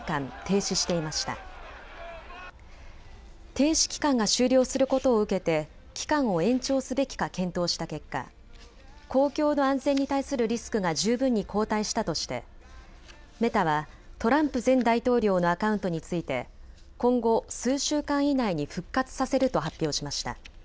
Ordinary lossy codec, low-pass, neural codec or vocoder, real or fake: none; none; none; real